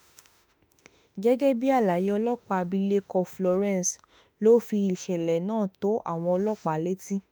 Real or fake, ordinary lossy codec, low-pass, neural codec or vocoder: fake; none; none; autoencoder, 48 kHz, 32 numbers a frame, DAC-VAE, trained on Japanese speech